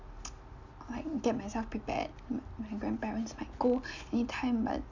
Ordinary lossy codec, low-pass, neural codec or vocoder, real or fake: none; 7.2 kHz; none; real